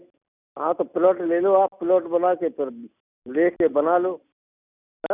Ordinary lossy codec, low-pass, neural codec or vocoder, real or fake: none; 3.6 kHz; none; real